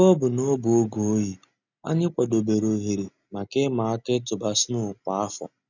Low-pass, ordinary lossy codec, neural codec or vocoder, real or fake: 7.2 kHz; none; none; real